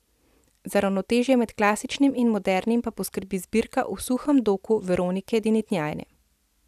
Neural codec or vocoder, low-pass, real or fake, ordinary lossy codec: none; 14.4 kHz; real; none